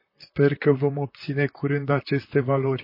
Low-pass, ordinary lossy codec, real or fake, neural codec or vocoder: 5.4 kHz; MP3, 24 kbps; fake; vocoder, 44.1 kHz, 128 mel bands, Pupu-Vocoder